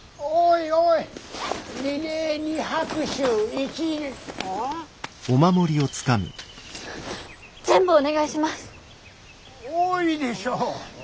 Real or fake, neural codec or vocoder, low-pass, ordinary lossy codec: real; none; none; none